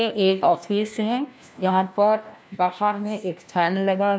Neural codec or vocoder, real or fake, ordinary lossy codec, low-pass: codec, 16 kHz, 1 kbps, FunCodec, trained on LibriTTS, 50 frames a second; fake; none; none